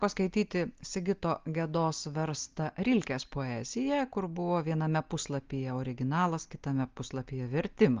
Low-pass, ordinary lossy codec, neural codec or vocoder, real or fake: 7.2 kHz; Opus, 24 kbps; none; real